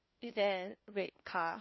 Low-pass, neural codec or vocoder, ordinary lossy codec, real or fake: 7.2 kHz; codec, 16 kHz, 1 kbps, FunCodec, trained on LibriTTS, 50 frames a second; MP3, 24 kbps; fake